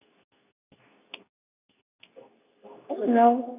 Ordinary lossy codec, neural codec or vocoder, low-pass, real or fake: none; codec, 24 kHz, 0.9 kbps, WavTokenizer, medium speech release version 2; 3.6 kHz; fake